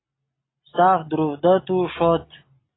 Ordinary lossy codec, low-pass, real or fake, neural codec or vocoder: AAC, 16 kbps; 7.2 kHz; real; none